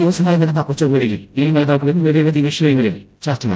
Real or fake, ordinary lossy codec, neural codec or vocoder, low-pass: fake; none; codec, 16 kHz, 0.5 kbps, FreqCodec, smaller model; none